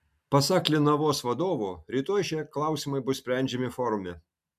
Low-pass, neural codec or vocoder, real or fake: 14.4 kHz; none; real